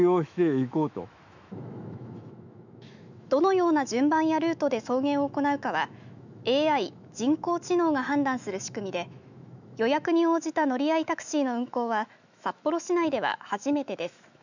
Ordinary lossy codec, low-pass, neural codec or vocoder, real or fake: none; 7.2 kHz; autoencoder, 48 kHz, 128 numbers a frame, DAC-VAE, trained on Japanese speech; fake